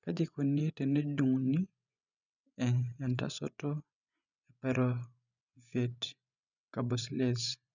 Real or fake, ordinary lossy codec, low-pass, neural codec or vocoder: fake; none; 7.2 kHz; vocoder, 24 kHz, 100 mel bands, Vocos